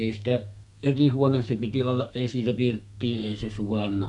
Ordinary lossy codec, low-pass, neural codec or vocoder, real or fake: none; 10.8 kHz; codec, 32 kHz, 1.9 kbps, SNAC; fake